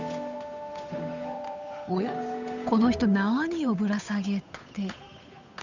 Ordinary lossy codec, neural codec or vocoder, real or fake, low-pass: none; codec, 16 kHz, 8 kbps, FunCodec, trained on Chinese and English, 25 frames a second; fake; 7.2 kHz